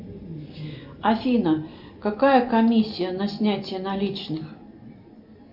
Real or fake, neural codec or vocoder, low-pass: real; none; 5.4 kHz